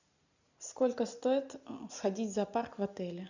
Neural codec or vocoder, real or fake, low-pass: none; real; 7.2 kHz